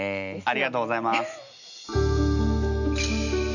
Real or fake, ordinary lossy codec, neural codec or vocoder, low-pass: real; none; none; 7.2 kHz